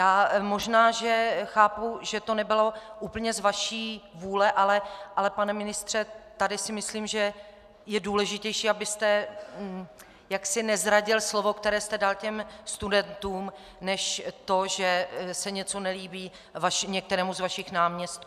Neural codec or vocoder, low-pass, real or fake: none; 14.4 kHz; real